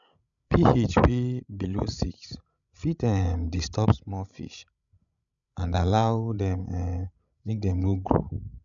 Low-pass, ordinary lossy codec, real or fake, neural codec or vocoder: 7.2 kHz; none; fake; codec, 16 kHz, 16 kbps, FreqCodec, larger model